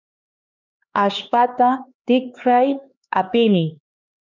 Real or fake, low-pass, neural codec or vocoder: fake; 7.2 kHz; codec, 16 kHz, 2 kbps, X-Codec, HuBERT features, trained on LibriSpeech